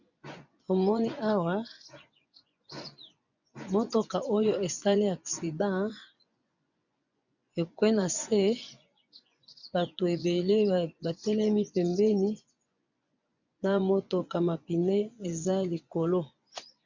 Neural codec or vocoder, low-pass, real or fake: vocoder, 24 kHz, 100 mel bands, Vocos; 7.2 kHz; fake